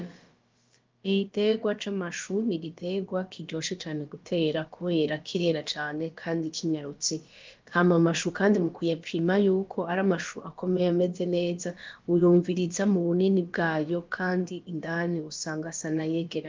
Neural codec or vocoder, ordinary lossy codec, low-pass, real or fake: codec, 16 kHz, about 1 kbps, DyCAST, with the encoder's durations; Opus, 32 kbps; 7.2 kHz; fake